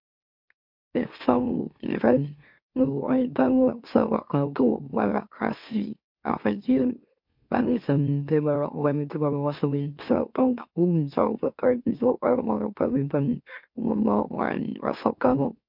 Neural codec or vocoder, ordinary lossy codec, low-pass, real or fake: autoencoder, 44.1 kHz, a latent of 192 numbers a frame, MeloTTS; MP3, 48 kbps; 5.4 kHz; fake